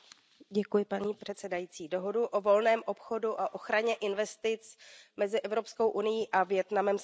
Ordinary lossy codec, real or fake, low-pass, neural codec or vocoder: none; real; none; none